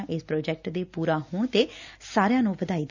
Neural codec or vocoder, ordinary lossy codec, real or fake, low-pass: none; none; real; 7.2 kHz